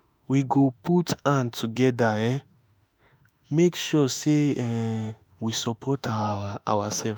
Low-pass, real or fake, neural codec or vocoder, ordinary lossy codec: none; fake; autoencoder, 48 kHz, 32 numbers a frame, DAC-VAE, trained on Japanese speech; none